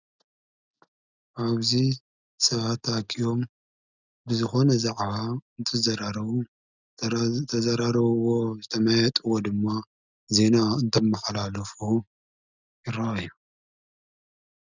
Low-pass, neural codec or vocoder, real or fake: 7.2 kHz; none; real